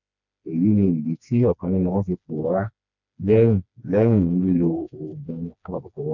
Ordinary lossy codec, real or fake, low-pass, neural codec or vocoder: none; fake; 7.2 kHz; codec, 16 kHz, 2 kbps, FreqCodec, smaller model